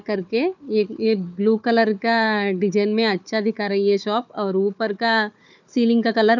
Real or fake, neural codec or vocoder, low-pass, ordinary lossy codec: fake; codec, 16 kHz, 4 kbps, FunCodec, trained on Chinese and English, 50 frames a second; 7.2 kHz; none